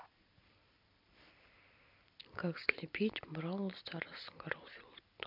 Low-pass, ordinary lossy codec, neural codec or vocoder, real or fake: 5.4 kHz; MP3, 48 kbps; vocoder, 44.1 kHz, 128 mel bands every 256 samples, BigVGAN v2; fake